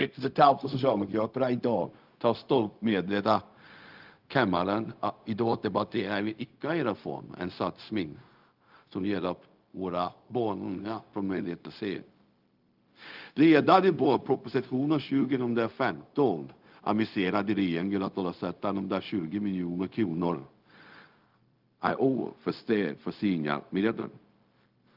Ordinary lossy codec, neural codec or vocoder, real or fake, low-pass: Opus, 32 kbps; codec, 16 kHz, 0.4 kbps, LongCat-Audio-Codec; fake; 5.4 kHz